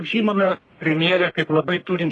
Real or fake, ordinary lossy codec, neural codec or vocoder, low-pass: fake; AAC, 32 kbps; codec, 44.1 kHz, 1.7 kbps, Pupu-Codec; 10.8 kHz